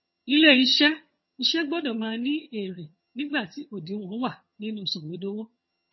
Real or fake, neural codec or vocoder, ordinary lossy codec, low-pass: fake; vocoder, 22.05 kHz, 80 mel bands, HiFi-GAN; MP3, 24 kbps; 7.2 kHz